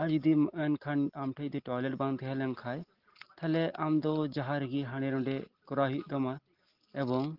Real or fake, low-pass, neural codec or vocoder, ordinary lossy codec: real; 5.4 kHz; none; Opus, 16 kbps